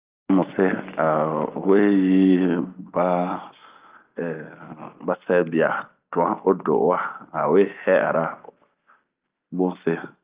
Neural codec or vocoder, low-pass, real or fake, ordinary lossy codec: none; 3.6 kHz; real; Opus, 24 kbps